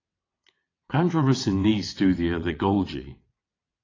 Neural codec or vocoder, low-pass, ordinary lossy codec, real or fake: vocoder, 22.05 kHz, 80 mel bands, WaveNeXt; 7.2 kHz; AAC, 32 kbps; fake